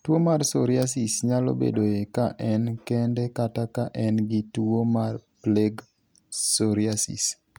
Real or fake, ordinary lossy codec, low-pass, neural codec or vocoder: real; none; none; none